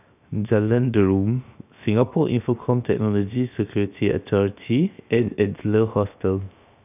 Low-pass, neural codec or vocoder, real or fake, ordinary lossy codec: 3.6 kHz; codec, 16 kHz, 0.7 kbps, FocalCodec; fake; none